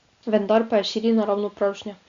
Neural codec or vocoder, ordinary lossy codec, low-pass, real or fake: none; Opus, 64 kbps; 7.2 kHz; real